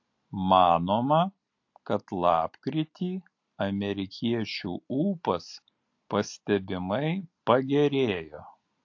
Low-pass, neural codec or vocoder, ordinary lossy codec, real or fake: 7.2 kHz; none; AAC, 48 kbps; real